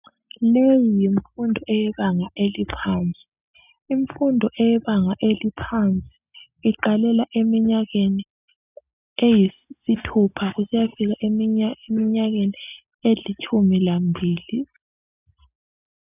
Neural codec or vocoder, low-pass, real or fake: none; 3.6 kHz; real